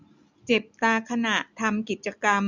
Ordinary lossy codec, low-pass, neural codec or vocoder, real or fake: none; 7.2 kHz; none; real